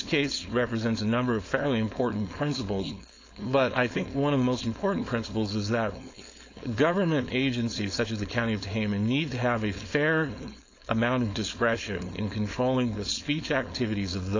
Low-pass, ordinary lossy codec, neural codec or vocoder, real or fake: 7.2 kHz; AAC, 48 kbps; codec, 16 kHz, 4.8 kbps, FACodec; fake